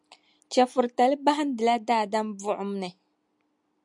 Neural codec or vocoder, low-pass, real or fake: none; 10.8 kHz; real